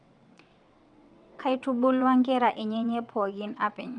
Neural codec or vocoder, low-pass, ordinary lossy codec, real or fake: vocoder, 22.05 kHz, 80 mel bands, WaveNeXt; 9.9 kHz; none; fake